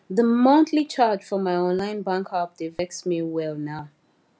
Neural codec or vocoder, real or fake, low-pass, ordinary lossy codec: none; real; none; none